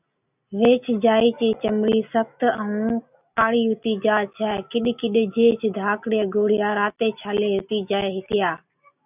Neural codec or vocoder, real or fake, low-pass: none; real; 3.6 kHz